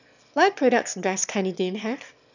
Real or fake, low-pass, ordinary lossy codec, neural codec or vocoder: fake; 7.2 kHz; none; autoencoder, 22.05 kHz, a latent of 192 numbers a frame, VITS, trained on one speaker